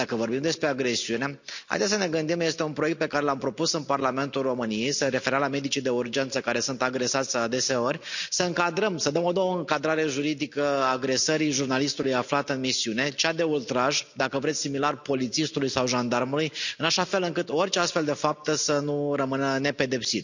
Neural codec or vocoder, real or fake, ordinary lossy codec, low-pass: none; real; none; 7.2 kHz